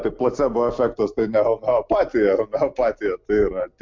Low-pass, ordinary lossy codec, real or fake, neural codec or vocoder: 7.2 kHz; MP3, 64 kbps; real; none